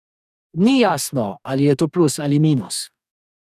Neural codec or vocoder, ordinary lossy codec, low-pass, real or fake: codec, 44.1 kHz, 2.6 kbps, DAC; none; 14.4 kHz; fake